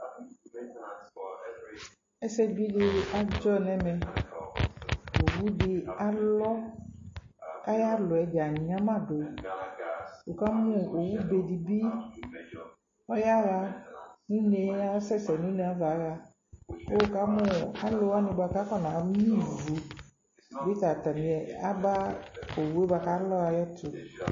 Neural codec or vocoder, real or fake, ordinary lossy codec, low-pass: none; real; MP3, 32 kbps; 7.2 kHz